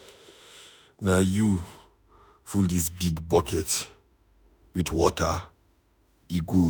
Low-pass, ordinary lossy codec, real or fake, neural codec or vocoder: none; none; fake; autoencoder, 48 kHz, 32 numbers a frame, DAC-VAE, trained on Japanese speech